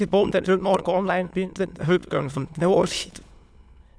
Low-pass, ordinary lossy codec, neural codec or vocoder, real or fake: none; none; autoencoder, 22.05 kHz, a latent of 192 numbers a frame, VITS, trained on many speakers; fake